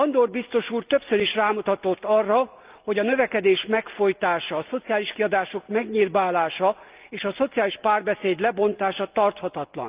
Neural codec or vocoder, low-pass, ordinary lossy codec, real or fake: none; 3.6 kHz; Opus, 24 kbps; real